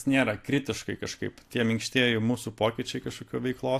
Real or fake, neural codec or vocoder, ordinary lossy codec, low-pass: real; none; AAC, 64 kbps; 14.4 kHz